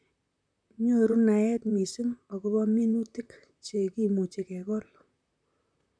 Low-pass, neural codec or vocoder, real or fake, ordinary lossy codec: 9.9 kHz; vocoder, 44.1 kHz, 128 mel bands, Pupu-Vocoder; fake; none